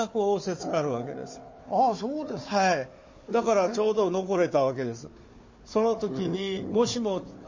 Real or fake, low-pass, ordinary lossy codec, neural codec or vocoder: fake; 7.2 kHz; MP3, 32 kbps; codec, 16 kHz, 4 kbps, FunCodec, trained on Chinese and English, 50 frames a second